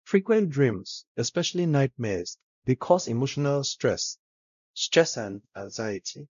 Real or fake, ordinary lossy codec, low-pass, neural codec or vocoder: fake; none; 7.2 kHz; codec, 16 kHz, 0.5 kbps, X-Codec, WavLM features, trained on Multilingual LibriSpeech